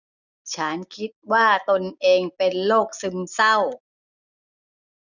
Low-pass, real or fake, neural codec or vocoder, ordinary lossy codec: 7.2 kHz; real; none; none